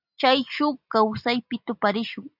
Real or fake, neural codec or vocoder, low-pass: real; none; 5.4 kHz